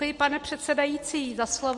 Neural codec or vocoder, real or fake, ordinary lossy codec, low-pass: none; real; MP3, 48 kbps; 10.8 kHz